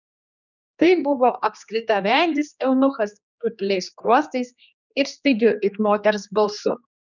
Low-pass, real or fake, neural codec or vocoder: 7.2 kHz; fake; codec, 16 kHz, 2 kbps, X-Codec, HuBERT features, trained on general audio